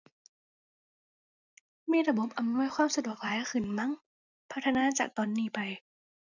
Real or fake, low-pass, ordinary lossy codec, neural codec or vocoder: real; 7.2 kHz; none; none